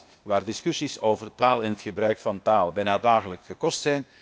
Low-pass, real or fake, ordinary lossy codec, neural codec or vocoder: none; fake; none; codec, 16 kHz, 0.8 kbps, ZipCodec